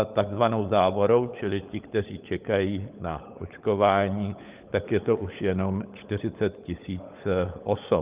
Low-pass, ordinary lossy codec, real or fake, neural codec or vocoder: 3.6 kHz; Opus, 24 kbps; fake; codec, 16 kHz, 16 kbps, FunCodec, trained on LibriTTS, 50 frames a second